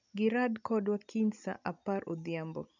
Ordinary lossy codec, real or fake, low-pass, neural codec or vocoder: none; real; 7.2 kHz; none